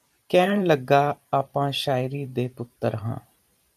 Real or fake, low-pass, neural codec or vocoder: fake; 14.4 kHz; vocoder, 44.1 kHz, 128 mel bands every 512 samples, BigVGAN v2